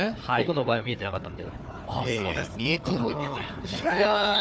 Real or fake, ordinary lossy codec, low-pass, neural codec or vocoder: fake; none; none; codec, 16 kHz, 4 kbps, FunCodec, trained on Chinese and English, 50 frames a second